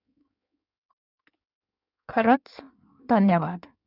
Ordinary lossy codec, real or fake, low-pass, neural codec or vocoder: none; fake; 5.4 kHz; codec, 16 kHz in and 24 kHz out, 1.1 kbps, FireRedTTS-2 codec